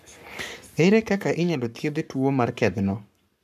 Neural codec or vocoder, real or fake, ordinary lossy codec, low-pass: codec, 44.1 kHz, 7.8 kbps, Pupu-Codec; fake; none; 14.4 kHz